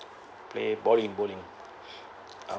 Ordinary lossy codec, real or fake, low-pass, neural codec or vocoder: none; real; none; none